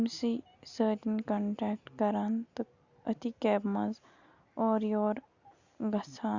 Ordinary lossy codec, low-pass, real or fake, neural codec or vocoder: Opus, 64 kbps; 7.2 kHz; real; none